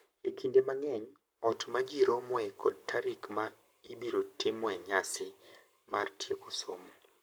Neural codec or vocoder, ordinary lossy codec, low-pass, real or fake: codec, 44.1 kHz, 7.8 kbps, Pupu-Codec; none; none; fake